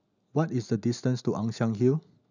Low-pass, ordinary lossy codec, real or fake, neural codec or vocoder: 7.2 kHz; none; real; none